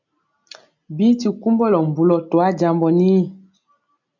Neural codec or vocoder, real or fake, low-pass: none; real; 7.2 kHz